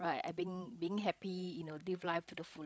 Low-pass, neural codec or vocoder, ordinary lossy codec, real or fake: none; codec, 16 kHz, 16 kbps, FreqCodec, larger model; none; fake